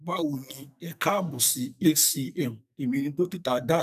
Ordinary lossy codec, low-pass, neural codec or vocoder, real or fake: none; 14.4 kHz; codec, 44.1 kHz, 2.6 kbps, SNAC; fake